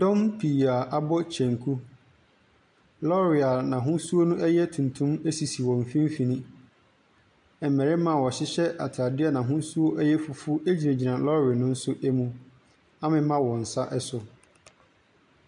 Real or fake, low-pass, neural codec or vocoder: real; 9.9 kHz; none